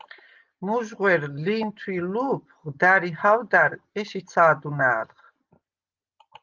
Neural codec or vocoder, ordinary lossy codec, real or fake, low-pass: none; Opus, 24 kbps; real; 7.2 kHz